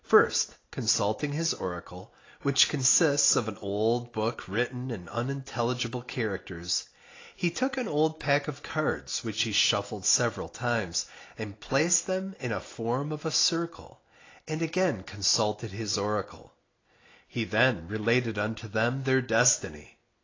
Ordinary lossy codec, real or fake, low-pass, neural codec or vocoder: AAC, 32 kbps; real; 7.2 kHz; none